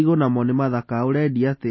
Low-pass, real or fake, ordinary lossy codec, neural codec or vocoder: 7.2 kHz; real; MP3, 24 kbps; none